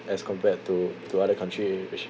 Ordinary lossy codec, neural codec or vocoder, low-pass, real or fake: none; none; none; real